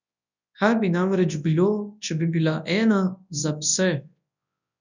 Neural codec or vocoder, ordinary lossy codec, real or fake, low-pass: codec, 24 kHz, 0.9 kbps, WavTokenizer, large speech release; none; fake; 7.2 kHz